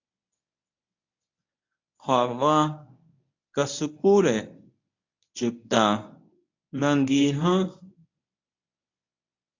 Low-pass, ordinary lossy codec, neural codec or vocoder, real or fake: 7.2 kHz; AAC, 48 kbps; codec, 24 kHz, 0.9 kbps, WavTokenizer, medium speech release version 1; fake